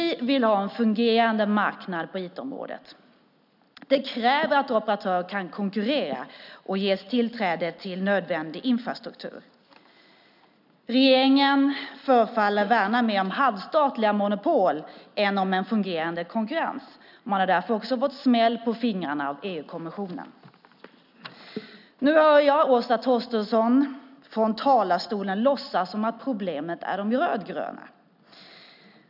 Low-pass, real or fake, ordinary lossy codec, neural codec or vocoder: 5.4 kHz; real; none; none